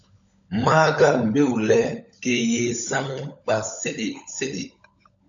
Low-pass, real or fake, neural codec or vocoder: 7.2 kHz; fake; codec, 16 kHz, 16 kbps, FunCodec, trained on LibriTTS, 50 frames a second